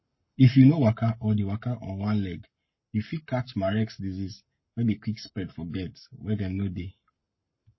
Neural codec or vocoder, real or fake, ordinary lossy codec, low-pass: codec, 44.1 kHz, 7.8 kbps, Pupu-Codec; fake; MP3, 24 kbps; 7.2 kHz